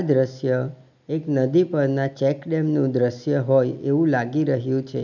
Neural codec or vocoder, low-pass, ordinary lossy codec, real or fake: none; 7.2 kHz; none; real